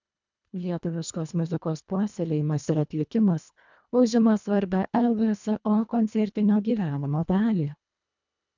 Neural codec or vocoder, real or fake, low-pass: codec, 24 kHz, 1.5 kbps, HILCodec; fake; 7.2 kHz